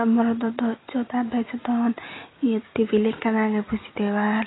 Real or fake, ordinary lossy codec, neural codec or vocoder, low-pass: real; AAC, 16 kbps; none; 7.2 kHz